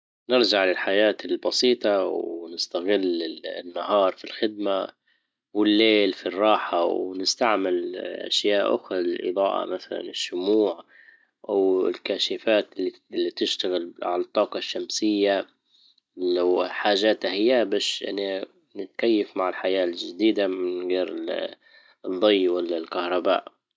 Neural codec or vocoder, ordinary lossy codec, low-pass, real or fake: none; none; none; real